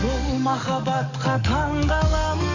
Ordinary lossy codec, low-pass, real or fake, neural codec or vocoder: none; 7.2 kHz; fake; codec, 44.1 kHz, 7.8 kbps, DAC